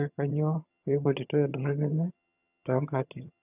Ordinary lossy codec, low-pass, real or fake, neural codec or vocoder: none; 3.6 kHz; fake; vocoder, 22.05 kHz, 80 mel bands, HiFi-GAN